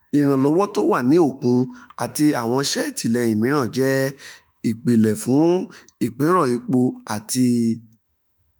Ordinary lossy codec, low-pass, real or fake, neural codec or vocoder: none; none; fake; autoencoder, 48 kHz, 32 numbers a frame, DAC-VAE, trained on Japanese speech